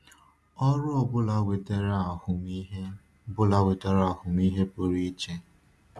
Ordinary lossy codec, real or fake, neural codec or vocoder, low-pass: none; real; none; none